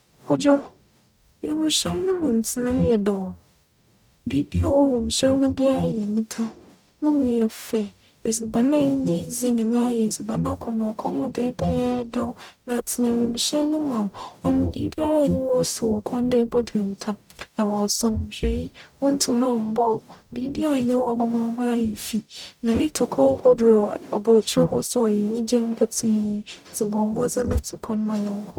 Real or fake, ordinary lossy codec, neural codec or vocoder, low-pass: fake; none; codec, 44.1 kHz, 0.9 kbps, DAC; 19.8 kHz